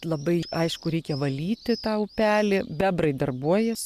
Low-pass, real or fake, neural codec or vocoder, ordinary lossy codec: 14.4 kHz; real; none; Opus, 64 kbps